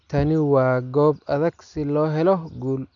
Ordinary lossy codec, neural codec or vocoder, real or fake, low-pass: none; none; real; 7.2 kHz